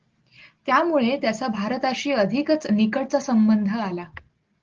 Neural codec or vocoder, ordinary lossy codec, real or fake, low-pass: none; Opus, 16 kbps; real; 7.2 kHz